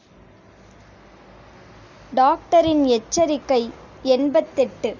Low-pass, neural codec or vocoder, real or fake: 7.2 kHz; none; real